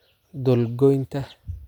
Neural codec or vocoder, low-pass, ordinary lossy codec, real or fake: none; 19.8 kHz; none; real